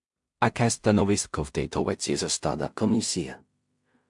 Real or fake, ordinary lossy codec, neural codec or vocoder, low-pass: fake; MP3, 64 kbps; codec, 16 kHz in and 24 kHz out, 0.4 kbps, LongCat-Audio-Codec, two codebook decoder; 10.8 kHz